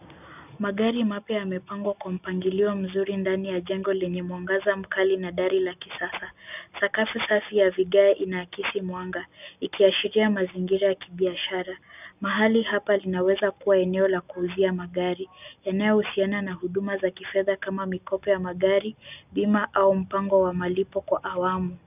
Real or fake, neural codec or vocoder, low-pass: real; none; 3.6 kHz